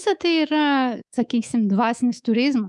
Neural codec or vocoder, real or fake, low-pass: codec, 24 kHz, 3.1 kbps, DualCodec; fake; 10.8 kHz